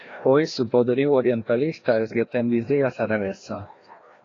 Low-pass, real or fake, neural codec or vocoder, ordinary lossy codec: 7.2 kHz; fake; codec, 16 kHz, 1 kbps, FreqCodec, larger model; AAC, 32 kbps